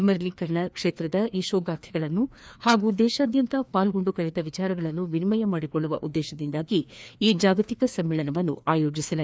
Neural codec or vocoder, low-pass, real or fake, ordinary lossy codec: codec, 16 kHz, 2 kbps, FreqCodec, larger model; none; fake; none